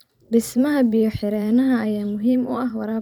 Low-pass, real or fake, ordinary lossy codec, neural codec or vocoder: 19.8 kHz; fake; none; vocoder, 44.1 kHz, 128 mel bands every 512 samples, BigVGAN v2